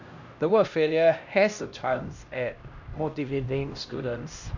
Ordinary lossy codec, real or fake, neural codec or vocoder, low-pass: none; fake; codec, 16 kHz, 1 kbps, X-Codec, HuBERT features, trained on LibriSpeech; 7.2 kHz